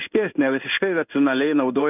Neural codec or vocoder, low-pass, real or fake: codec, 16 kHz in and 24 kHz out, 1 kbps, XY-Tokenizer; 3.6 kHz; fake